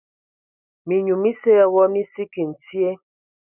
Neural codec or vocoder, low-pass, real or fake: none; 3.6 kHz; real